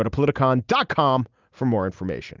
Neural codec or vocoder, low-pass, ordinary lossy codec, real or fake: none; 7.2 kHz; Opus, 24 kbps; real